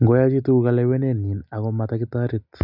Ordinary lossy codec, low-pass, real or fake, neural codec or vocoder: none; 5.4 kHz; real; none